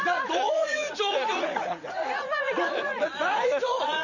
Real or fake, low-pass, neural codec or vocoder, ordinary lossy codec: fake; 7.2 kHz; vocoder, 44.1 kHz, 80 mel bands, Vocos; none